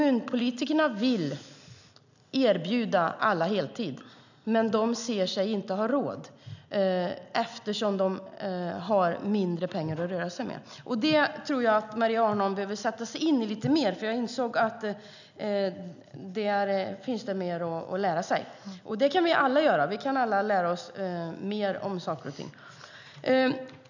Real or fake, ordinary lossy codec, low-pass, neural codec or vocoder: real; none; 7.2 kHz; none